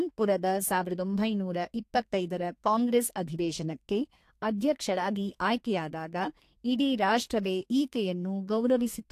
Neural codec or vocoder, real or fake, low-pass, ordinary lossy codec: codec, 32 kHz, 1.9 kbps, SNAC; fake; 14.4 kHz; AAC, 64 kbps